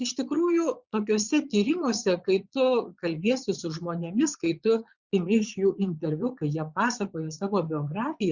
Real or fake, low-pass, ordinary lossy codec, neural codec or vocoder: fake; 7.2 kHz; Opus, 64 kbps; vocoder, 22.05 kHz, 80 mel bands, Vocos